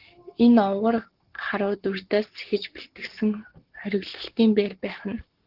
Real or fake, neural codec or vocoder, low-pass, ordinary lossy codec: fake; codec, 44.1 kHz, 7.8 kbps, Pupu-Codec; 5.4 kHz; Opus, 16 kbps